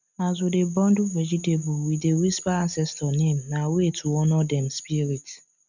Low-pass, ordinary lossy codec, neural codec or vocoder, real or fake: 7.2 kHz; none; none; real